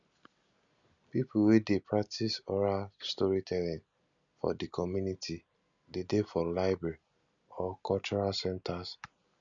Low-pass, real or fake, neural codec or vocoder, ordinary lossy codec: 7.2 kHz; real; none; none